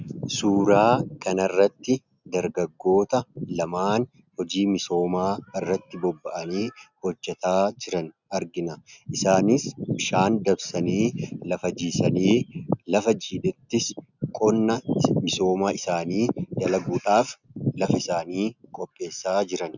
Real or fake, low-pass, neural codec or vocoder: real; 7.2 kHz; none